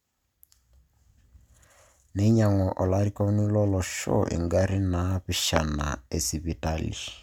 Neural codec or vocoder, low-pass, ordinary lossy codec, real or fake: none; 19.8 kHz; none; real